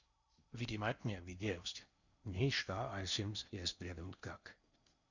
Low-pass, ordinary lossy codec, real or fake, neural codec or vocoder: 7.2 kHz; Opus, 64 kbps; fake; codec, 16 kHz in and 24 kHz out, 0.8 kbps, FocalCodec, streaming, 65536 codes